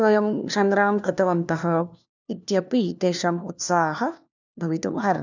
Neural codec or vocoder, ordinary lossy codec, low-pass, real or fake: codec, 16 kHz, 1 kbps, FunCodec, trained on LibriTTS, 50 frames a second; none; 7.2 kHz; fake